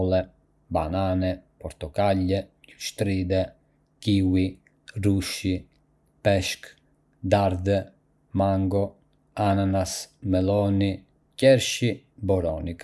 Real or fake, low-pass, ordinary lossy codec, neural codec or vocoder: real; none; none; none